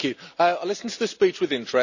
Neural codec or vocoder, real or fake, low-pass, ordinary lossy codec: none; real; 7.2 kHz; none